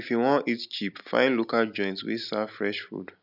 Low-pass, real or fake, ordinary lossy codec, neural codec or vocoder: 5.4 kHz; real; none; none